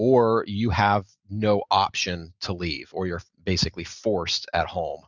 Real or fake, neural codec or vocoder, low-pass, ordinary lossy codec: real; none; 7.2 kHz; Opus, 64 kbps